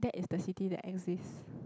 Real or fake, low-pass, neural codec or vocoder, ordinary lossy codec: real; none; none; none